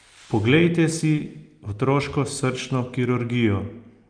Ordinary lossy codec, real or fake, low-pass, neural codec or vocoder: Opus, 32 kbps; real; 9.9 kHz; none